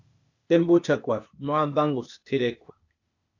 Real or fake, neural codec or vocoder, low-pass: fake; codec, 16 kHz, 0.8 kbps, ZipCodec; 7.2 kHz